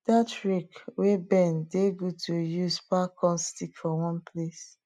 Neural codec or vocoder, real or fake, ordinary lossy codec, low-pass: none; real; none; none